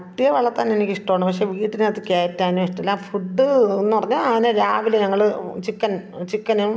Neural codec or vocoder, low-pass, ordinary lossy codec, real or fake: none; none; none; real